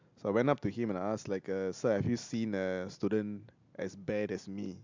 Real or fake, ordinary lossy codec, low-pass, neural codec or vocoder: real; MP3, 64 kbps; 7.2 kHz; none